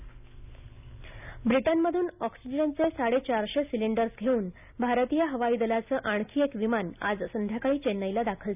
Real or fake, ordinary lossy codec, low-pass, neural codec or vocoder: real; none; 3.6 kHz; none